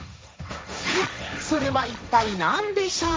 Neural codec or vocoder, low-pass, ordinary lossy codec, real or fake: codec, 16 kHz, 1.1 kbps, Voila-Tokenizer; none; none; fake